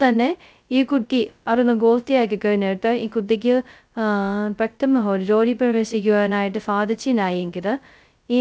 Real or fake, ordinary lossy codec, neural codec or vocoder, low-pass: fake; none; codec, 16 kHz, 0.2 kbps, FocalCodec; none